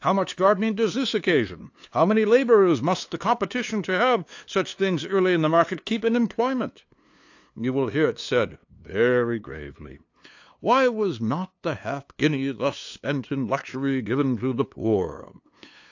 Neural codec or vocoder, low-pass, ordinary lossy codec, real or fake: codec, 16 kHz, 2 kbps, FunCodec, trained on LibriTTS, 25 frames a second; 7.2 kHz; AAC, 48 kbps; fake